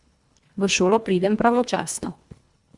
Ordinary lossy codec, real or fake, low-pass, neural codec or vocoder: Opus, 64 kbps; fake; 10.8 kHz; codec, 24 kHz, 1.5 kbps, HILCodec